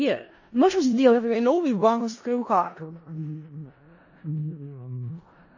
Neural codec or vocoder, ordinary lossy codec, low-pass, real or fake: codec, 16 kHz in and 24 kHz out, 0.4 kbps, LongCat-Audio-Codec, four codebook decoder; MP3, 32 kbps; 7.2 kHz; fake